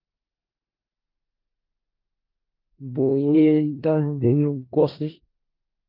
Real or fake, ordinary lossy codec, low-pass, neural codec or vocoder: fake; Opus, 24 kbps; 5.4 kHz; codec, 16 kHz in and 24 kHz out, 0.4 kbps, LongCat-Audio-Codec, four codebook decoder